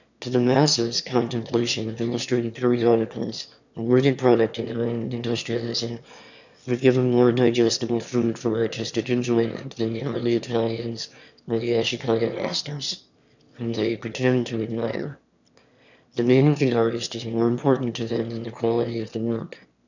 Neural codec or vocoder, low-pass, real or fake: autoencoder, 22.05 kHz, a latent of 192 numbers a frame, VITS, trained on one speaker; 7.2 kHz; fake